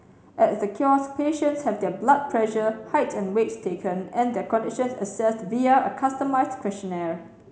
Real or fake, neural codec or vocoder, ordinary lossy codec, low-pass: real; none; none; none